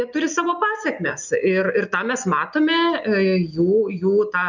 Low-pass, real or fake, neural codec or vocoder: 7.2 kHz; real; none